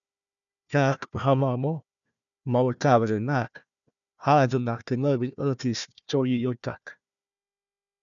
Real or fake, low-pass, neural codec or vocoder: fake; 7.2 kHz; codec, 16 kHz, 1 kbps, FunCodec, trained on Chinese and English, 50 frames a second